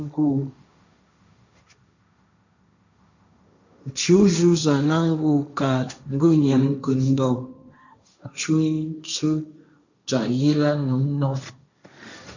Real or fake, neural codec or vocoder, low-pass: fake; codec, 16 kHz, 1.1 kbps, Voila-Tokenizer; 7.2 kHz